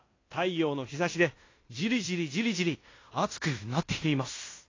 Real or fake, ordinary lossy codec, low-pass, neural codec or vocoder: fake; AAC, 32 kbps; 7.2 kHz; codec, 16 kHz in and 24 kHz out, 0.9 kbps, LongCat-Audio-Codec, fine tuned four codebook decoder